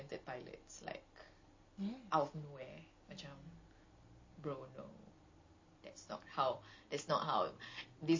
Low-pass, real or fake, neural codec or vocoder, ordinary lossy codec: 7.2 kHz; real; none; MP3, 32 kbps